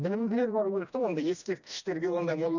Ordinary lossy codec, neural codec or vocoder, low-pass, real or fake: none; codec, 16 kHz, 1 kbps, FreqCodec, smaller model; 7.2 kHz; fake